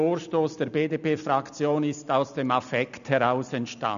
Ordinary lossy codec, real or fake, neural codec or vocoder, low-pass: none; real; none; 7.2 kHz